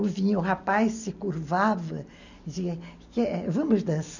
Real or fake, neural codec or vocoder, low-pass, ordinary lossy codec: real; none; 7.2 kHz; none